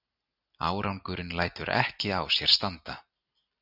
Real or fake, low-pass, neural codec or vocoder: real; 5.4 kHz; none